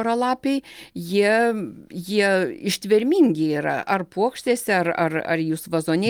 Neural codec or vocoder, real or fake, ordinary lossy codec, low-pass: none; real; Opus, 32 kbps; 19.8 kHz